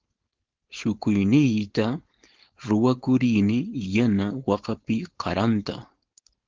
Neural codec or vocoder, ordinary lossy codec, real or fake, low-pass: codec, 16 kHz, 4.8 kbps, FACodec; Opus, 16 kbps; fake; 7.2 kHz